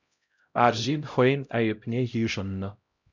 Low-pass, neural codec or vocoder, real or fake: 7.2 kHz; codec, 16 kHz, 0.5 kbps, X-Codec, HuBERT features, trained on LibriSpeech; fake